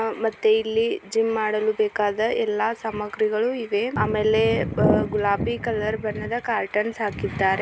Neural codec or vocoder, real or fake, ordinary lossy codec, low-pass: none; real; none; none